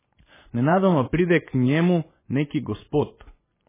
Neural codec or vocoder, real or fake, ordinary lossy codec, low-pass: vocoder, 24 kHz, 100 mel bands, Vocos; fake; MP3, 16 kbps; 3.6 kHz